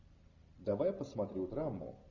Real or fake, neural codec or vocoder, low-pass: real; none; 7.2 kHz